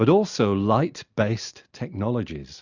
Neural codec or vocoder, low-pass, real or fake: none; 7.2 kHz; real